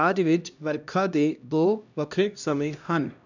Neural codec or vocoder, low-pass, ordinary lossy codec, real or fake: codec, 16 kHz, 1 kbps, X-Codec, HuBERT features, trained on LibriSpeech; 7.2 kHz; none; fake